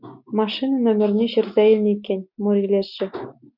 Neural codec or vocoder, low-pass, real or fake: none; 5.4 kHz; real